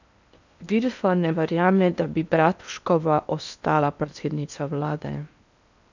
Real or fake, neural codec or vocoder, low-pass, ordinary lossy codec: fake; codec, 16 kHz in and 24 kHz out, 0.8 kbps, FocalCodec, streaming, 65536 codes; 7.2 kHz; none